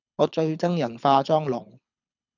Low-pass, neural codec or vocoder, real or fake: 7.2 kHz; codec, 24 kHz, 6 kbps, HILCodec; fake